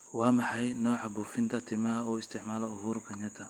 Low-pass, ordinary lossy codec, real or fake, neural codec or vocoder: 19.8 kHz; Opus, 32 kbps; fake; vocoder, 48 kHz, 128 mel bands, Vocos